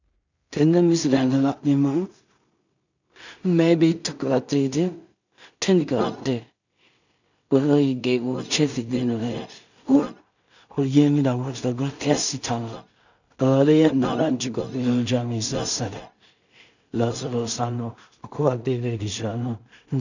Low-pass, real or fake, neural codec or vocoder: 7.2 kHz; fake; codec, 16 kHz in and 24 kHz out, 0.4 kbps, LongCat-Audio-Codec, two codebook decoder